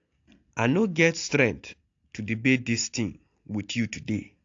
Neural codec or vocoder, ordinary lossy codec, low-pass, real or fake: none; AAC, 48 kbps; 7.2 kHz; real